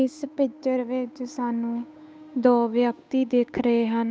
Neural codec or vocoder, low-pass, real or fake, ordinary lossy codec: codec, 16 kHz, 8 kbps, FunCodec, trained on Chinese and English, 25 frames a second; none; fake; none